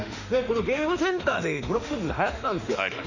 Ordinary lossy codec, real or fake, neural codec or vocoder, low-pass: none; fake; autoencoder, 48 kHz, 32 numbers a frame, DAC-VAE, trained on Japanese speech; 7.2 kHz